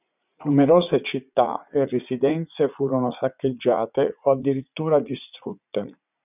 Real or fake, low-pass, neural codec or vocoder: fake; 3.6 kHz; vocoder, 44.1 kHz, 80 mel bands, Vocos